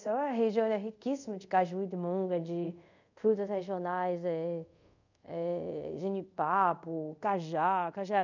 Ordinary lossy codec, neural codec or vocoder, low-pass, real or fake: none; codec, 24 kHz, 0.5 kbps, DualCodec; 7.2 kHz; fake